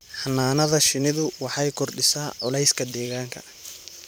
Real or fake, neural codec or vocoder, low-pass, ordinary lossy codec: fake; vocoder, 44.1 kHz, 128 mel bands every 512 samples, BigVGAN v2; none; none